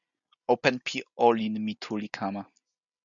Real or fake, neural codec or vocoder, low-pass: real; none; 7.2 kHz